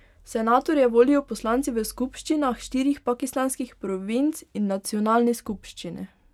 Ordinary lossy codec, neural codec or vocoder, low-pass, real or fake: none; none; 19.8 kHz; real